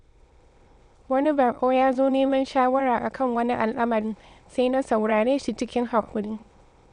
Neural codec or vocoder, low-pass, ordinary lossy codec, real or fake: autoencoder, 22.05 kHz, a latent of 192 numbers a frame, VITS, trained on many speakers; 9.9 kHz; MP3, 64 kbps; fake